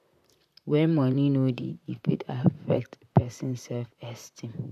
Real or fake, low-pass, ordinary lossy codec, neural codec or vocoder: fake; 14.4 kHz; none; vocoder, 44.1 kHz, 128 mel bands, Pupu-Vocoder